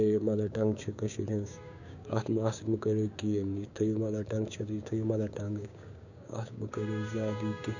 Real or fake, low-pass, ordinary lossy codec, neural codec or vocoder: fake; 7.2 kHz; none; codec, 16 kHz, 6 kbps, DAC